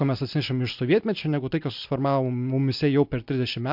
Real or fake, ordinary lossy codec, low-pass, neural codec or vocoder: real; MP3, 48 kbps; 5.4 kHz; none